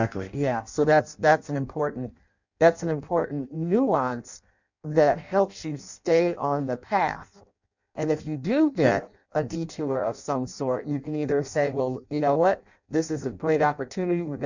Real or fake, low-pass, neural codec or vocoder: fake; 7.2 kHz; codec, 16 kHz in and 24 kHz out, 0.6 kbps, FireRedTTS-2 codec